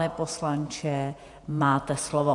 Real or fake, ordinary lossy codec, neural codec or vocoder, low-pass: fake; AAC, 64 kbps; vocoder, 48 kHz, 128 mel bands, Vocos; 10.8 kHz